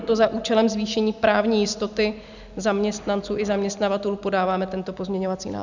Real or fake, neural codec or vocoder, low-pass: real; none; 7.2 kHz